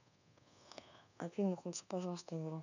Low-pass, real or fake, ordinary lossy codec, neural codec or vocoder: 7.2 kHz; fake; none; codec, 24 kHz, 1.2 kbps, DualCodec